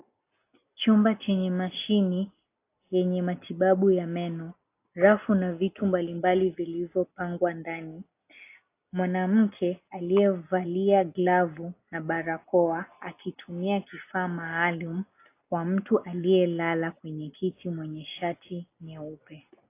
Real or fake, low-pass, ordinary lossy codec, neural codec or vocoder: real; 3.6 kHz; AAC, 24 kbps; none